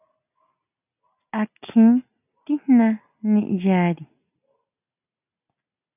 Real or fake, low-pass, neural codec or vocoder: real; 3.6 kHz; none